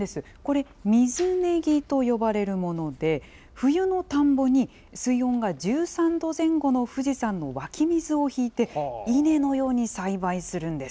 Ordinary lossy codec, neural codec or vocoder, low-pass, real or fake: none; none; none; real